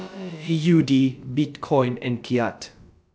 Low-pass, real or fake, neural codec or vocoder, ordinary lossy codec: none; fake; codec, 16 kHz, about 1 kbps, DyCAST, with the encoder's durations; none